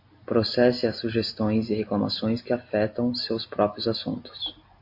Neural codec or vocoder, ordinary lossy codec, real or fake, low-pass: none; MP3, 32 kbps; real; 5.4 kHz